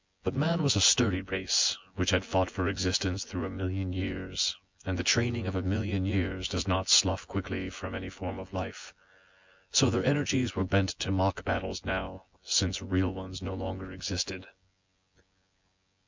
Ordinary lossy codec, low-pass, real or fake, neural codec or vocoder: MP3, 64 kbps; 7.2 kHz; fake; vocoder, 24 kHz, 100 mel bands, Vocos